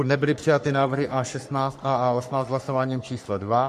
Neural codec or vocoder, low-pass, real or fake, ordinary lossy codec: codec, 44.1 kHz, 3.4 kbps, Pupu-Codec; 14.4 kHz; fake; MP3, 64 kbps